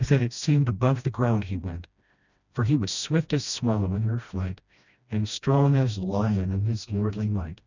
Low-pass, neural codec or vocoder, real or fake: 7.2 kHz; codec, 16 kHz, 1 kbps, FreqCodec, smaller model; fake